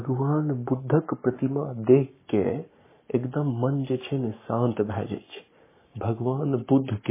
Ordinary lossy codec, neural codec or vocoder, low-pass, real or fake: MP3, 16 kbps; codec, 44.1 kHz, 7.8 kbps, Pupu-Codec; 3.6 kHz; fake